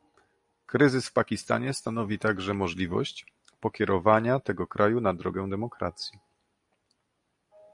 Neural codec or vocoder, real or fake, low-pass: none; real; 10.8 kHz